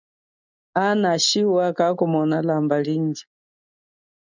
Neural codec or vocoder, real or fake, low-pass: none; real; 7.2 kHz